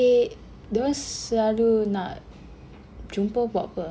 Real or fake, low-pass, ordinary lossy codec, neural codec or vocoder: real; none; none; none